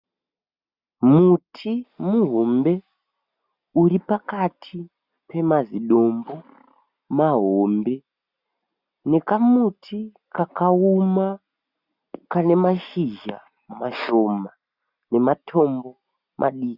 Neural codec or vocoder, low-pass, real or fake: none; 5.4 kHz; real